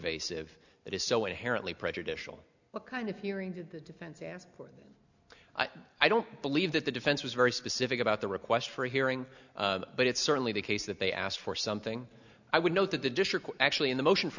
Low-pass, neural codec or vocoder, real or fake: 7.2 kHz; none; real